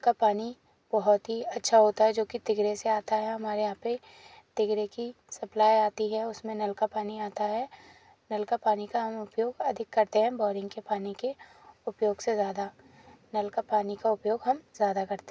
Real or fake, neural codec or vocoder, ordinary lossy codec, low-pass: real; none; none; none